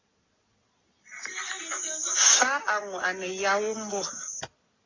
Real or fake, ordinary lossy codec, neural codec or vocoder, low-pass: real; AAC, 32 kbps; none; 7.2 kHz